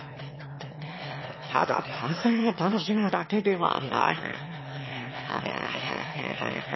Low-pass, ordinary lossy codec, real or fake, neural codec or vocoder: 7.2 kHz; MP3, 24 kbps; fake; autoencoder, 22.05 kHz, a latent of 192 numbers a frame, VITS, trained on one speaker